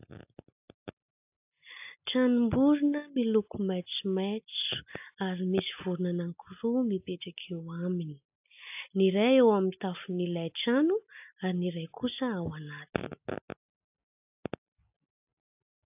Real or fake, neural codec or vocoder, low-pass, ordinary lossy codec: real; none; 3.6 kHz; AAC, 32 kbps